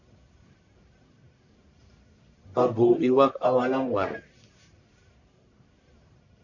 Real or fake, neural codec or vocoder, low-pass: fake; codec, 44.1 kHz, 1.7 kbps, Pupu-Codec; 7.2 kHz